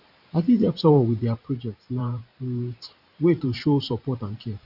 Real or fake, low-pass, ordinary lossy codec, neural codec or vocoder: fake; 5.4 kHz; none; vocoder, 44.1 kHz, 128 mel bands every 512 samples, BigVGAN v2